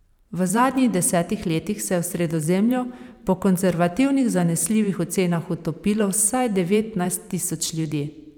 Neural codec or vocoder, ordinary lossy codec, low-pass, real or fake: vocoder, 44.1 kHz, 128 mel bands every 512 samples, BigVGAN v2; none; 19.8 kHz; fake